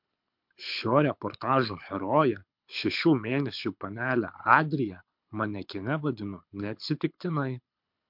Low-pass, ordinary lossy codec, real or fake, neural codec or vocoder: 5.4 kHz; MP3, 48 kbps; fake; codec, 24 kHz, 6 kbps, HILCodec